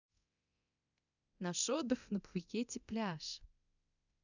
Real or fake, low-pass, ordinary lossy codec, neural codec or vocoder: fake; 7.2 kHz; none; codec, 24 kHz, 0.9 kbps, DualCodec